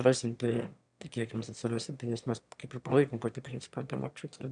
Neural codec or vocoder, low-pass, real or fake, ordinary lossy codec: autoencoder, 22.05 kHz, a latent of 192 numbers a frame, VITS, trained on one speaker; 9.9 kHz; fake; MP3, 96 kbps